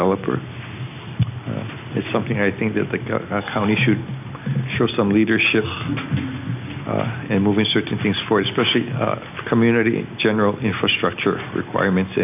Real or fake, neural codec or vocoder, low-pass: real; none; 3.6 kHz